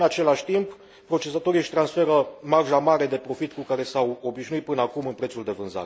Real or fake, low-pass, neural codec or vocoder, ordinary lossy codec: real; none; none; none